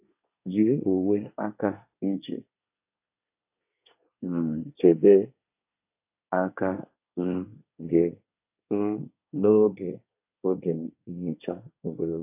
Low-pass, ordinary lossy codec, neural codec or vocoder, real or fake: 3.6 kHz; AAC, 32 kbps; codec, 24 kHz, 1 kbps, SNAC; fake